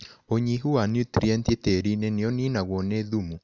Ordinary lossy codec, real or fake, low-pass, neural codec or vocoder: none; real; 7.2 kHz; none